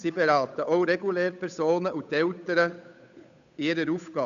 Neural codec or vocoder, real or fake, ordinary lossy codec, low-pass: codec, 16 kHz, 8 kbps, FunCodec, trained on Chinese and English, 25 frames a second; fake; none; 7.2 kHz